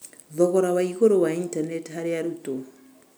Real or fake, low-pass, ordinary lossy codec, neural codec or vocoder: real; none; none; none